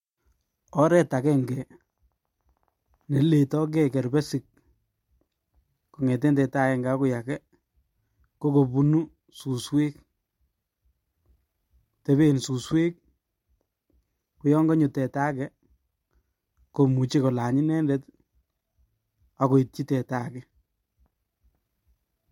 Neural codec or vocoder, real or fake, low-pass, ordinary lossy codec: none; real; 19.8 kHz; MP3, 64 kbps